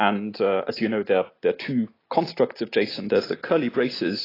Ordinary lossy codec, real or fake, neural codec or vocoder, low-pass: AAC, 24 kbps; real; none; 5.4 kHz